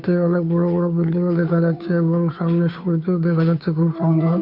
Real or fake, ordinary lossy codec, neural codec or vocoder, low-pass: fake; none; codec, 16 kHz, 2 kbps, FunCodec, trained on Chinese and English, 25 frames a second; 5.4 kHz